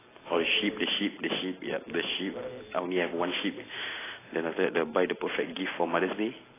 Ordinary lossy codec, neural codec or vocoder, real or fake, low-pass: AAC, 16 kbps; none; real; 3.6 kHz